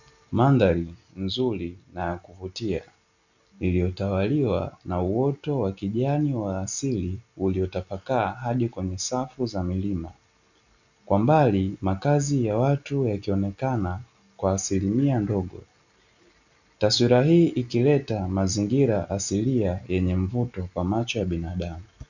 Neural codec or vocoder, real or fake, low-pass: none; real; 7.2 kHz